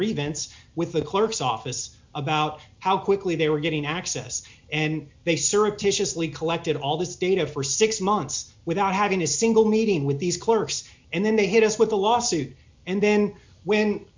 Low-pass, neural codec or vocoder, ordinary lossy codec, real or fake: 7.2 kHz; none; MP3, 64 kbps; real